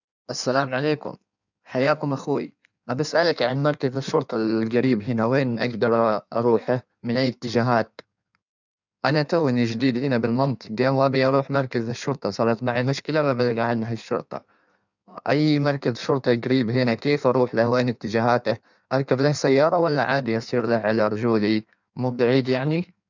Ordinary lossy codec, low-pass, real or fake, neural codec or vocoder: none; 7.2 kHz; fake; codec, 16 kHz in and 24 kHz out, 1.1 kbps, FireRedTTS-2 codec